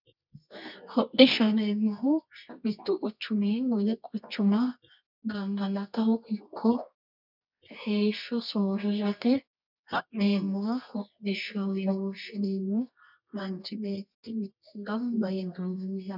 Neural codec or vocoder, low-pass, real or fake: codec, 24 kHz, 0.9 kbps, WavTokenizer, medium music audio release; 5.4 kHz; fake